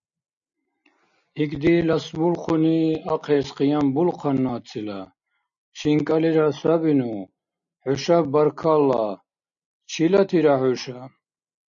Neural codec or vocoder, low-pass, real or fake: none; 7.2 kHz; real